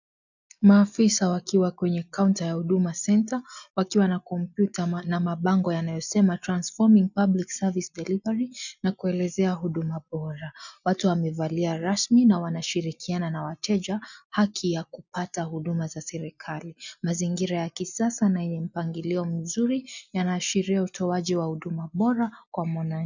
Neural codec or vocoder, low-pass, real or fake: none; 7.2 kHz; real